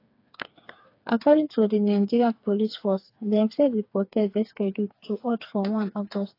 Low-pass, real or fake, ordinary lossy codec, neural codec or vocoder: 5.4 kHz; fake; AAC, 32 kbps; codec, 16 kHz, 4 kbps, FreqCodec, smaller model